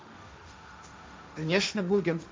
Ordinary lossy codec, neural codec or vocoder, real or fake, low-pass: none; codec, 16 kHz, 1.1 kbps, Voila-Tokenizer; fake; none